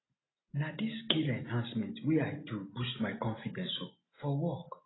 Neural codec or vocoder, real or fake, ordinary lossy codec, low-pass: none; real; AAC, 16 kbps; 7.2 kHz